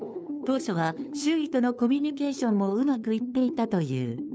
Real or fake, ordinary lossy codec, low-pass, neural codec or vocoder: fake; none; none; codec, 16 kHz, 2 kbps, FunCodec, trained on LibriTTS, 25 frames a second